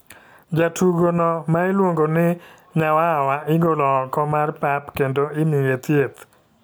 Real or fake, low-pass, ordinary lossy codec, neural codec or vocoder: real; none; none; none